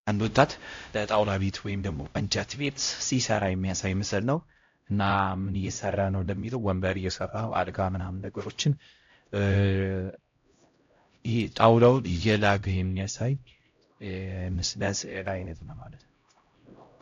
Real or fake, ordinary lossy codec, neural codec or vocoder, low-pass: fake; AAC, 48 kbps; codec, 16 kHz, 0.5 kbps, X-Codec, HuBERT features, trained on LibriSpeech; 7.2 kHz